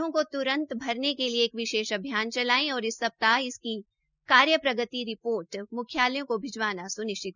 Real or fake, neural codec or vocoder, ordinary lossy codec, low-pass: real; none; none; 7.2 kHz